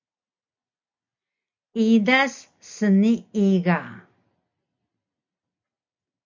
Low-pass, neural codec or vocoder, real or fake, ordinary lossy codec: 7.2 kHz; none; real; MP3, 64 kbps